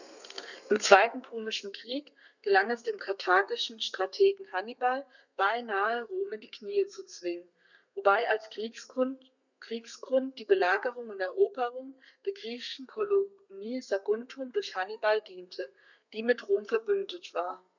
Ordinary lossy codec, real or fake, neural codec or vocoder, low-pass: none; fake; codec, 32 kHz, 1.9 kbps, SNAC; 7.2 kHz